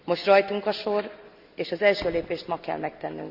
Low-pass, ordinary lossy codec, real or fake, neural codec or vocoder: 5.4 kHz; none; real; none